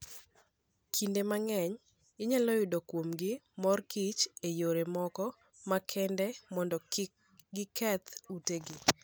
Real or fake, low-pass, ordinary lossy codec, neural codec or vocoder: real; none; none; none